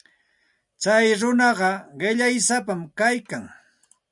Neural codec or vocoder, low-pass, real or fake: none; 10.8 kHz; real